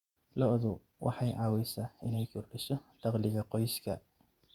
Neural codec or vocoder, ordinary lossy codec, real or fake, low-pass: vocoder, 48 kHz, 128 mel bands, Vocos; Opus, 64 kbps; fake; 19.8 kHz